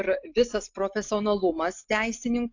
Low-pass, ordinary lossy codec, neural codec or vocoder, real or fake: 7.2 kHz; AAC, 48 kbps; none; real